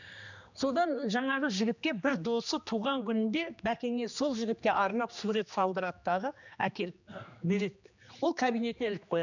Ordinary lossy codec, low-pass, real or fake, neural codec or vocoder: none; 7.2 kHz; fake; codec, 16 kHz, 2 kbps, X-Codec, HuBERT features, trained on general audio